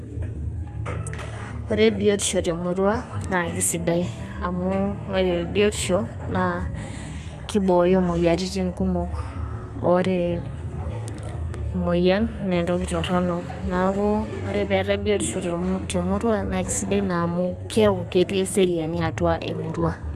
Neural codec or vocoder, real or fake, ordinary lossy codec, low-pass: codec, 32 kHz, 1.9 kbps, SNAC; fake; AAC, 96 kbps; 14.4 kHz